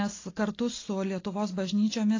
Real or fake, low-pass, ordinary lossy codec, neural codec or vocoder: real; 7.2 kHz; AAC, 32 kbps; none